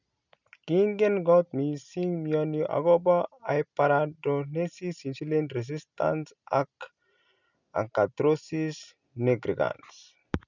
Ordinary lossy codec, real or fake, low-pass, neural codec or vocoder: none; real; 7.2 kHz; none